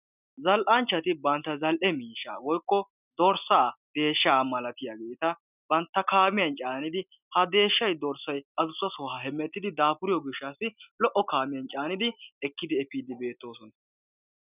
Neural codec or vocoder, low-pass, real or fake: none; 3.6 kHz; real